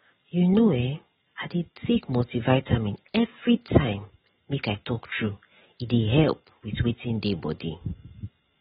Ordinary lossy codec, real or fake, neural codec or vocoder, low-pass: AAC, 16 kbps; real; none; 10.8 kHz